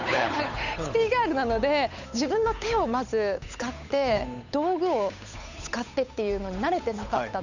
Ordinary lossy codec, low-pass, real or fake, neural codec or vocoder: none; 7.2 kHz; fake; codec, 16 kHz, 8 kbps, FunCodec, trained on Chinese and English, 25 frames a second